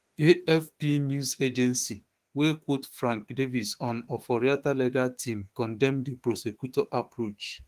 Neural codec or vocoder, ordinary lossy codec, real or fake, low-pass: autoencoder, 48 kHz, 32 numbers a frame, DAC-VAE, trained on Japanese speech; Opus, 24 kbps; fake; 14.4 kHz